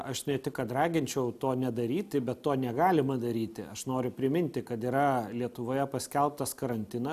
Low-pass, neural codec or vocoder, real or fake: 14.4 kHz; none; real